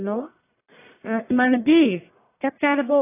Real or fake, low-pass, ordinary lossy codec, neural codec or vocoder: fake; 3.6 kHz; AAC, 24 kbps; codec, 44.1 kHz, 1.7 kbps, Pupu-Codec